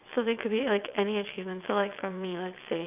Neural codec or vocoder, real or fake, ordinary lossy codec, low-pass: vocoder, 22.05 kHz, 80 mel bands, WaveNeXt; fake; none; 3.6 kHz